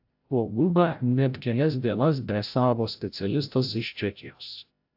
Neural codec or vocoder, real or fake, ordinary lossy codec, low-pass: codec, 16 kHz, 0.5 kbps, FreqCodec, larger model; fake; AAC, 48 kbps; 5.4 kHz